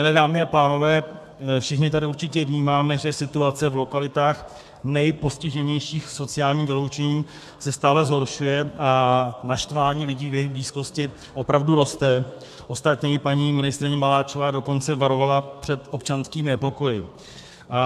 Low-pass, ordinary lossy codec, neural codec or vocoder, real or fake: 14.4 kHz; AAC, 96 kbps; codec, 32 kHz, 1.9 kbps, SNAC; fake